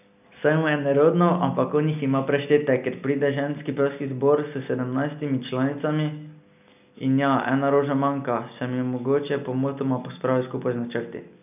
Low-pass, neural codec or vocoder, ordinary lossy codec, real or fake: 3.6 kHz; none; none; real